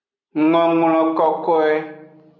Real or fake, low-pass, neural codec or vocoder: real; 7.2 kHz; none